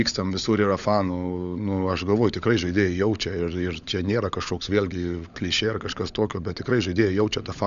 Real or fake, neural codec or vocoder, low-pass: fake; codec, 16 kHz, 16 kbps, FunCodec, trained on LibriTTS, 50 frames a second; 7.2 kHz